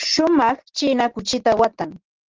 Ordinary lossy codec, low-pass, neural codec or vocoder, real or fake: Opus, 16 kbps; 7.2 kHz; none; real